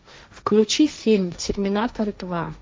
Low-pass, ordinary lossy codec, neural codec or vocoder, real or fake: none; none; codec, 16 kHz, 1.1 kbps, Voila-Tokenizer; fake